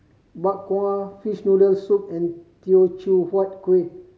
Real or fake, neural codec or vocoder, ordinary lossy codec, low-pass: real; none; none; none